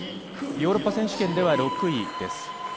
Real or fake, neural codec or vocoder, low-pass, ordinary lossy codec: real; none; none; none